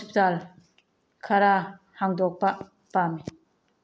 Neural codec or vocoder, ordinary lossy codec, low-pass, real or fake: none; none; none; real